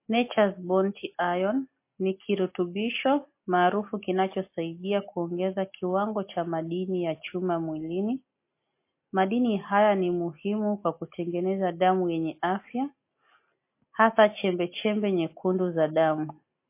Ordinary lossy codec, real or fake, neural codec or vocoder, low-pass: MP3, 24 kbps; real; none; 3.6 kHz